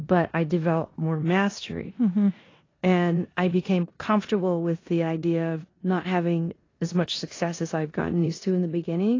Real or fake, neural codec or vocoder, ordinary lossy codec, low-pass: fake; codec, 16 kHz in and 24 kHz out, 0.9 kbps, LongCat-Audio-Codec, four codebook decoder; AAC, 32 kbps; 7.2 kHz